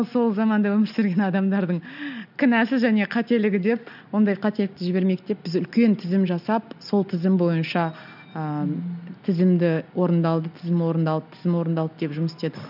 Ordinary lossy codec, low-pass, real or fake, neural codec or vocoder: none; 5.4 kHz; real; none